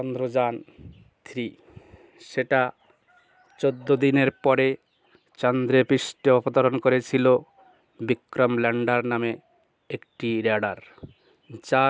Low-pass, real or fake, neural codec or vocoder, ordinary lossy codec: none; real; none; none